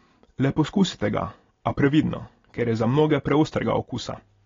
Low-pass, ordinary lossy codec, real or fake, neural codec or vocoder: 7.2 kHz; AAC, 32 kbps; real; none